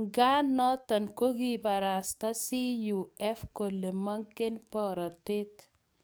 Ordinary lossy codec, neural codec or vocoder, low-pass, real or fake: none; codec, 44.1 kHz, 7.8 kbps, DAC; none; fake